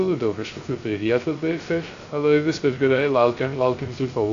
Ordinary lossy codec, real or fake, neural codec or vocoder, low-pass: AAC, 48 kbps; fake; codec, 16 kHz, 0.3 kbps, FocalCodec; 7.2 kHz